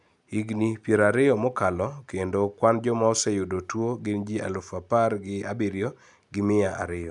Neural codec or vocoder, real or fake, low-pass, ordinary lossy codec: none; real; 10.8 kHz; none